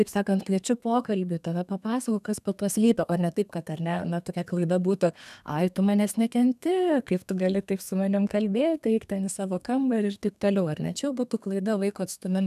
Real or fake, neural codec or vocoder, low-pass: fake; codec, 32 kHz, 1.9 kbps, SNAC; 14.4 kHz